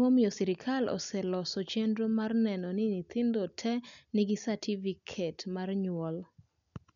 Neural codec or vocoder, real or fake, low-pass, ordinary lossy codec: none; real; 7.2 kHz; none